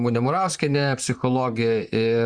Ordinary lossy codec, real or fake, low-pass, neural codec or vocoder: Opus, 64 kbps; fake; 9.9 kHz; vocoder, 44.1 kHz, 128 mel bands, Pupu-Vocoder